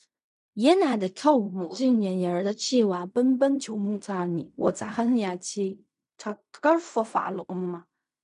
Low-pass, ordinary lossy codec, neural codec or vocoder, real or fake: 10.8 kHz; none; codec, 16 kHz in and 24 kHz out, 0.4 kbps, LongCat-Audio-Codec, fine tuned four codebook decoder; fake